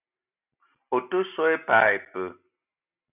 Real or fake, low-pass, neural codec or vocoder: real; 3.6 kHz; none